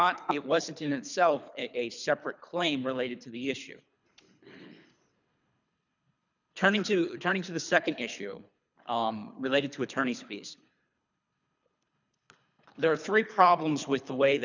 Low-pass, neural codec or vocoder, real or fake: 7.2 kHz; codec, 24 kHz, 3 kbps, HILCodec; fake